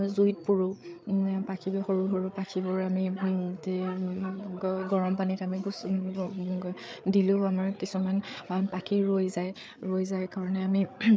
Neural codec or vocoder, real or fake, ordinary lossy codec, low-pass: codec, 16 kHz, 8 kbps, FreqCodec, larger model; fake; none; none